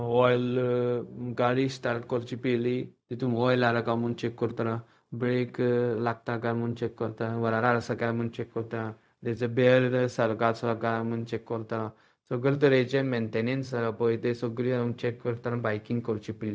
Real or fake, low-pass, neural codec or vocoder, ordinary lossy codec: fake; none; codec, 16 kHz, 0.4 kbps, LongCat-Audio-Codec; none